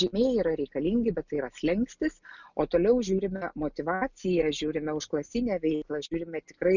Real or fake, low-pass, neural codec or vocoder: real; 7.2 kHz; none